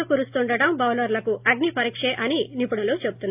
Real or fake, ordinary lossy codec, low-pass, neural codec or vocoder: real; AAC, 32 kbps; 3.6 kHz; none